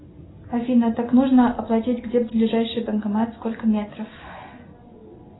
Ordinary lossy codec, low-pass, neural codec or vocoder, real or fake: AAC, 16 kbps; 7.2 kHz; none; real